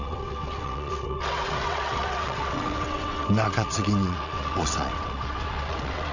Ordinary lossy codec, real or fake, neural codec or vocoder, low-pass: none; fake; vocoder, 22.05 kHz, 80 mel bands, WaveNeXt; 7.2 kHz